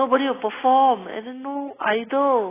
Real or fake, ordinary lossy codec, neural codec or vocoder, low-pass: real; AAC, 16 kbps; none; 3.6 kHz